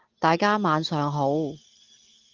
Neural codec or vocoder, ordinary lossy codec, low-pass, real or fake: none; Opus, 24 kbps; 7.2 kHz; real